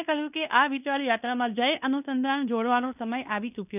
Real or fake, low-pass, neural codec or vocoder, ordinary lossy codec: fake; 3.6 kHz; codec, 16 kHz in and 24 kHz out, 0.9 kbps, LongCat-Audio-Codec, fine tuned four codebook decoder; none